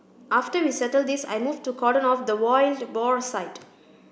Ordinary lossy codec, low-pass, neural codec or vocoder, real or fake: none; none; none; real